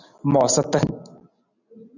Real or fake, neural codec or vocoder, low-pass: real; none; 7.2 kHz